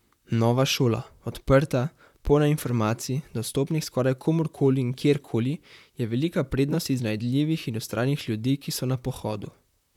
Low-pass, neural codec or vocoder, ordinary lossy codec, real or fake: 19.8 kHz; vocoder, 44.1 kHz, 128 mel bands, Pupu-Vocoder; none; fake